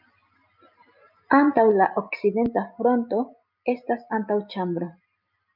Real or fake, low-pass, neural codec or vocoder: real; 5.4 kHz; none